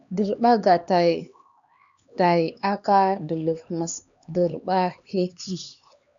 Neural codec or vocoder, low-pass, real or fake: codec, 16 kHz, 2 kbps, X-Codec, HuBERT features, trained on LibriSpeech; 7.2 kHz; fake